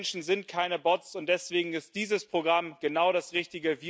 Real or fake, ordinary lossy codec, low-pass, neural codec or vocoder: real; none; none; none